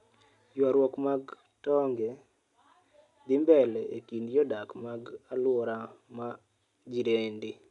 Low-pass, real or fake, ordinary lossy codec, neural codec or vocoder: 10.8 kHz; real; none; none